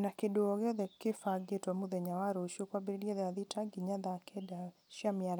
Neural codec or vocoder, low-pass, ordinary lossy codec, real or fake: none; none; none; real